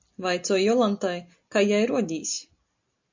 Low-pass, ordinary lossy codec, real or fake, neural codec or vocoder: 7.2 kHz; MP3, 48 kbps; real; none